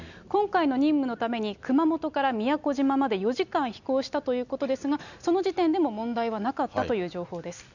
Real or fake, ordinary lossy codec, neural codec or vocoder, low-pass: real; none; none; 7.2 kHz